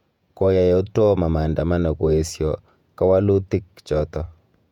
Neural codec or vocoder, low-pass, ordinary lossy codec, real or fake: vocoder, 48 kHz, 128 mel bands, Vocos; 19.8 kHz; none; fake